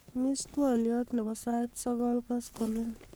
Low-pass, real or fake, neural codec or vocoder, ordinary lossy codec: none; fake; codec, 44.1 kHz, 3.4 kbps, Pupu-Codec; none